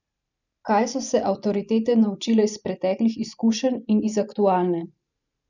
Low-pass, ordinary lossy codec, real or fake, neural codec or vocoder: 7.2 kHz; none; fake; vocoder, 44.1 kHz, 128 mel bands every 512 samples, BigVGAN v2